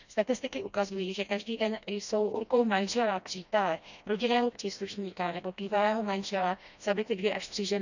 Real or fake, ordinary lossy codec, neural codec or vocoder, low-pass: fake; none; codec, 16 kHz, 1 kbps, FreqCodec, smaller model; 7.2 kHz